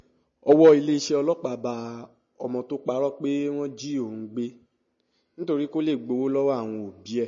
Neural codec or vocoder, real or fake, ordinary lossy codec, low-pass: none; real; MP3, 32 kbps; 7.2 kHz